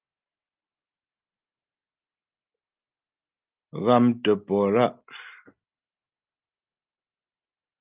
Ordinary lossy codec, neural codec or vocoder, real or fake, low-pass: Opus, 32 kbps; none; real; 3.6 kHz